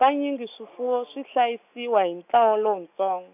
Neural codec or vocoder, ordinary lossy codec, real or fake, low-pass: none; none; real; 3.6 kHz